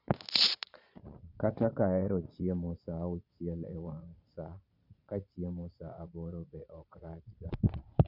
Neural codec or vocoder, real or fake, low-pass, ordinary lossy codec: vocoder, 22.05 kHz, 80 mel bands, Vocos; fake; 5.4 kHz; none